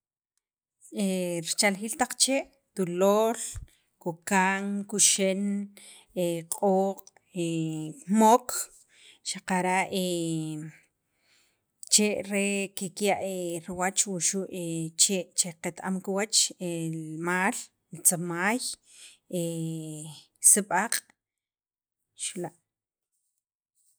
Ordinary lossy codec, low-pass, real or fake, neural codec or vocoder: none; none; real; none